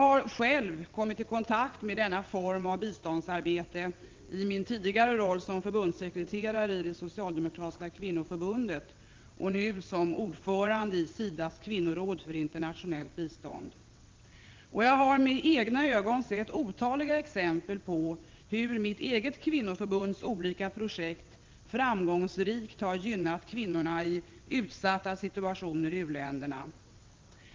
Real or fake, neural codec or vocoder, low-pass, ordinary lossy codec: fake; vocoder, 22.05 kHz, 80 mel bands, WaveNeXt; 7.2 kHz; Opus, 32 kbps